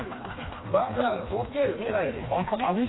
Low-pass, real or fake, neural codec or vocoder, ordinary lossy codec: 7.2 kHz; fake; codec, 16 kHz, 2 kbps, FreqCodec, smaller model; AAC, 16 kbps